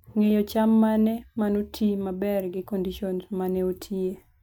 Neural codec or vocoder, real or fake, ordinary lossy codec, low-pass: none; real; MP3, 96 kbps; 19.8 kHz